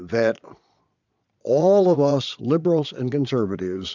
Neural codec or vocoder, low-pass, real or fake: vocoder, 22.05 kHz, 80 mel bands, Vocos; 7.2 kHz; fake